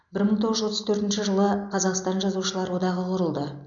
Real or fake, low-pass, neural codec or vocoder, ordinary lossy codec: real; 7.2 kHz; none; none